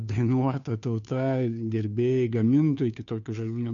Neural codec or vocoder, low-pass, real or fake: codec, 16 kHz, 2 kbps, FunCodec, trained on Chinese and English, 25 frames a second; 7.2 kHz; fake